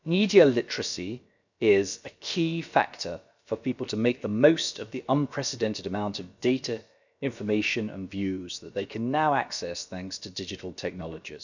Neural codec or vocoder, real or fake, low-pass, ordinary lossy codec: codec, 16 kHz, about 1 kbps, DyCAST, with the encoder's durations; fake; 7.2 kHz; none